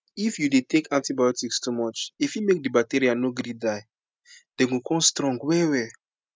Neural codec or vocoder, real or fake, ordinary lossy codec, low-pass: none; real; none; none